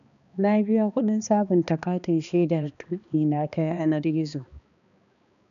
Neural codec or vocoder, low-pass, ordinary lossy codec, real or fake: codec, 16 kHz, 2 kbps, X-Codec, HuBERT features, trained on balanced general audio; 7.2 kHz; none; fake